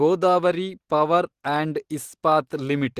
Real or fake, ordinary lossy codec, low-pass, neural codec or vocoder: real; Opus, 16 kbps; 14.4 kHz; none